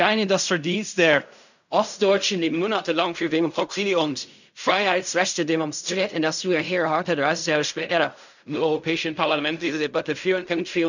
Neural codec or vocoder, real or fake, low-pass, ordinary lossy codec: codec, 16 kHz in and 24 kHz out, 0.4 kbps, LongCat-Audio-Codec, fine tuned four codebook decoder; fake; 7.2 kHz; none